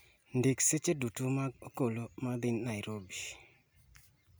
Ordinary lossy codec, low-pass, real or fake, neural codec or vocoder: none; none; real; none